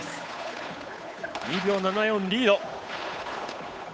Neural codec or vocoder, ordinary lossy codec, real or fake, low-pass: codec, 16 kHz, 8 kbps, FunCodec, trained on Chinese and English, 25 frames a second; none; fake; none